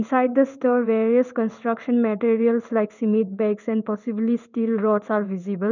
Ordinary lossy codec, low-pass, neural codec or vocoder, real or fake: none; 7.2 kHz; codec, 16 kHz in and 24 kHz out, 1 kbps, XY-Tokenizer; fake